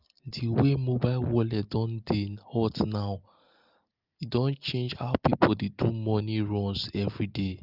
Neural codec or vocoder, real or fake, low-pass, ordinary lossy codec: none; real; 5.4 kHz; Opus, 32 kbps